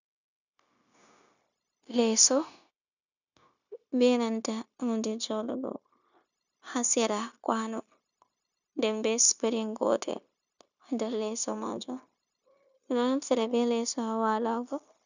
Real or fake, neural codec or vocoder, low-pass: fake; codec, 16 kHz, 0.9 kbps, LongCat-Audio-Codec; 7.2 kHz